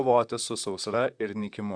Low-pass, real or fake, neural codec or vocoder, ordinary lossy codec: 9.9 kHz; fake; vocoder, 44.1 kHz, 128 mel bands, Pupu-Vocoder; Opus, 64 kbps